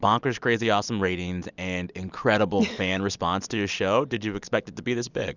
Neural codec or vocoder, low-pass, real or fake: none; 7.2 kHz; real